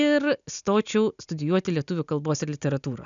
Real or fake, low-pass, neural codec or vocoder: real; 7.2 kHz; none